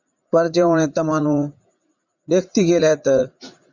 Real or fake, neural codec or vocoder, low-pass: fake; vocoder, 44.1 kHz, 80 mel bands, Vocos; 7.2 kHz